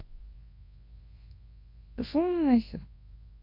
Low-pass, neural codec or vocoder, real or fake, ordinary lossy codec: 5.4 kHz; codec, 24 kHz, 0.9 kbps, WavTokenizer, large speech release; fake; MP3, 32 kbps